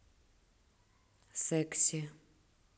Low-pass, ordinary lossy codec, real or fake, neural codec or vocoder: none; none; real; none